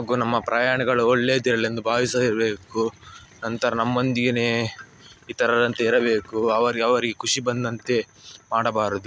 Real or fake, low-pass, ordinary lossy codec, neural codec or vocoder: real; none; none; none